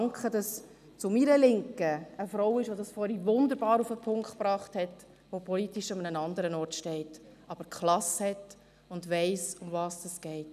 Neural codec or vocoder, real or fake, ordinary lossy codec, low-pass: none; real; none; 14.4 kHz